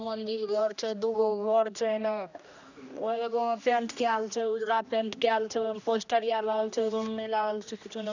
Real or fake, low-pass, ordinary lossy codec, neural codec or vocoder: fake; 7.2 kHz; none; codec, 16 kHz, 1 kbps, X-Codec, HuBERT features, trained on general audio